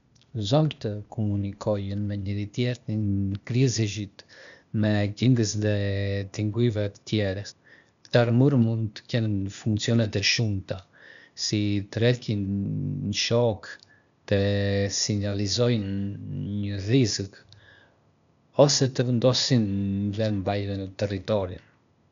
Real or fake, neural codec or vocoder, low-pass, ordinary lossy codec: fake; codec, 16 kHz, 0.8 kbps, ZipCodec; 7.2 kHz; none